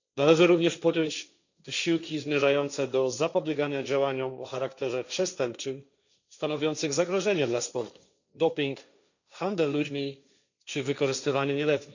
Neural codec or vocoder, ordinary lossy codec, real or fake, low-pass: codec, 16 kHz, 1.1 kbps, Voila-Tokenizer; none; fake; 7.2 kHz